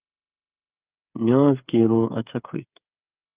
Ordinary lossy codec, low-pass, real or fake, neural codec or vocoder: Opus, 24 kbps; 3.6 kHz; fake; codec, 16 kHz, 8 kbps, FreqCodec, smaller model